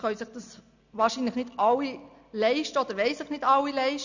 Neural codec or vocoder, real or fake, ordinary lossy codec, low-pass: none; real; none; 7.2 kHz